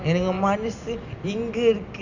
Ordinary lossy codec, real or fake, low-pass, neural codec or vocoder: none; real; 7.2 kHz; none